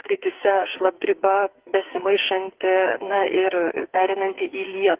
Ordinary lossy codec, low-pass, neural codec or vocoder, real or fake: Opus, 16 kbps; 3.6 kHz; codec, 44.1 kHz, 2.6 kbps, SNAC; fake